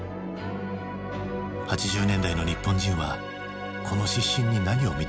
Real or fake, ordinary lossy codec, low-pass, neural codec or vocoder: real; none; none; none